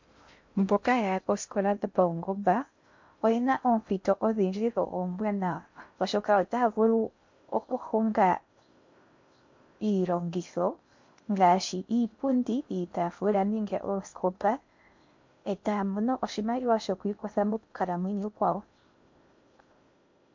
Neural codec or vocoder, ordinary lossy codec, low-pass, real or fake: codec, 16 kHz in and 24 kHz out, 0.6 kbps, FocalCodec, streaming, 2048 codes; MP3, 48 kbps; 7.2 kHz; fake